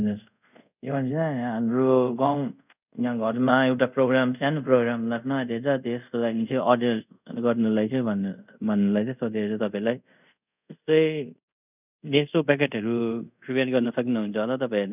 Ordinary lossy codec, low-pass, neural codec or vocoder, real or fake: none; 3.6 kHz; codec, 24 kHz, 0.5 kbps, DualCodec; fake